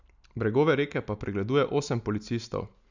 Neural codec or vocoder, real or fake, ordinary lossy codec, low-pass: none; real; none; 7.2 kHz